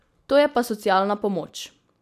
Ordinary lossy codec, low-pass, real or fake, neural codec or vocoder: none; 14.4 kHz; real; none